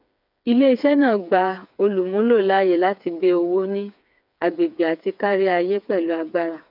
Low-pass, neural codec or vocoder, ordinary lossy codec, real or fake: 5.4 kHz; codec, 16 kHz, 4 kbps, FreqCodec, smaller model; none; fake